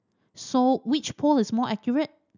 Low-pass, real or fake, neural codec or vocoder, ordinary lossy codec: 7.2 kHz; real; none; none